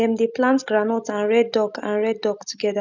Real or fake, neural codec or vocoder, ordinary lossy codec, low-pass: real; none; none; 7.2 kHz